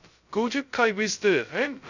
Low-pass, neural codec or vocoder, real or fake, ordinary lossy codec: 7.2 kHz; codec, 16 kHz, 0.2 kbps, FocalCodec; fake; none